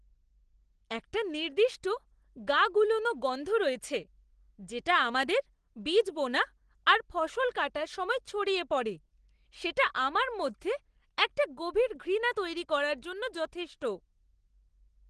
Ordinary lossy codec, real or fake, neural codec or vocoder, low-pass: Opus, 16 kbps; real; none; 9.9 kHz